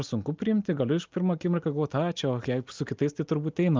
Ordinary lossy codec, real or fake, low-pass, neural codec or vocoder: Opus, 24 kbps; real; 7.2 kHz; none